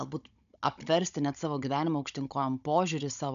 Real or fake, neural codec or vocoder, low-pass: fake; codec, 16 kHz, 16 kbps, FunCodec, trained on Chinese and English, 50 frames a second; 7.2 kHz